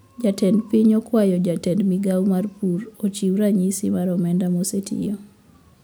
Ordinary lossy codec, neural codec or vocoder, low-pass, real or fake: none; none; none; real